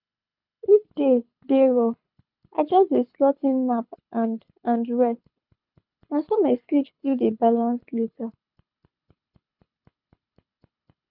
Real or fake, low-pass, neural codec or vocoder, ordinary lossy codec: fake; 5.4 kHz; codec, 24 kHz, 6 kbps, HILCodec; none